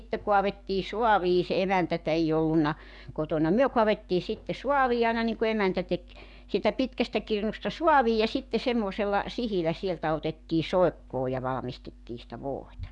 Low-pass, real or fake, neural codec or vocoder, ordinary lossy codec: 10.8 kHz; fake; codec, 44.1 kHz, 7.8 kbps, DAC; none